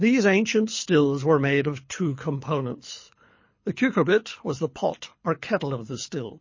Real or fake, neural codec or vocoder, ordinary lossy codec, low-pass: fake; codec, 24 kHz, 6 kbps, HILCodec; MP3, 32 kbps; 7.2 kHz